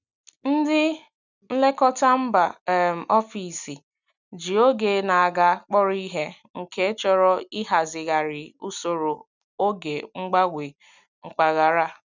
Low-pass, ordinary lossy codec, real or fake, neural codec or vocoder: 7.2 kHz; none; real; none